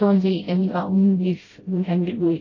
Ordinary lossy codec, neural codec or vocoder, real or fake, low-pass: AAC, 32 kbps; codec, 16 kHz, 0.5 kbps, FreqCodec, smaller model; fake; 7.2 kHz